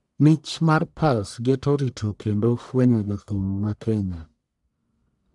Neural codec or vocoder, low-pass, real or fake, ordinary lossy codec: codec, 44.1 kHz, 1.7 kbps, Pupu-Codec; 10.8 kHz; fake; none